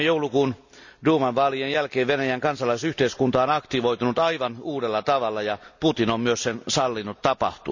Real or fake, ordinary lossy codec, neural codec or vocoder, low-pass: real; none; none; 7.2 kHz